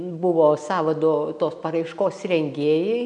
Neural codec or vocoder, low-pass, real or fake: none; 9.9 kHz; real